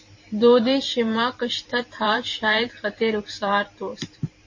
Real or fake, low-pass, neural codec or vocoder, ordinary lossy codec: fake; 7.2 kHz; vocoder, 44.1 kHz, 128 mel bands every 512 samples, BigVGAN v2; MP3, 32 kbps